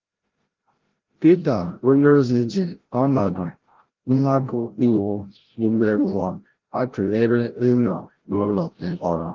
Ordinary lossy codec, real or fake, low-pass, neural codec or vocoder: Opus, 16 kbps; fake; 7.2 kHz; codec, 16 kHz, 0.5 kbps, FreqCodec, larger model